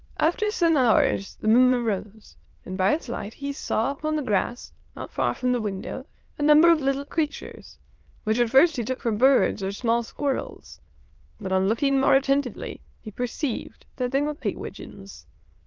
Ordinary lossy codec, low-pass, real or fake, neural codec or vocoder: Opus, 32 kbps; 7.2 kHz; fake; autoencoder, 22.05 kHz, a latent of 192 numbers a frame, VITS, trained on many speakers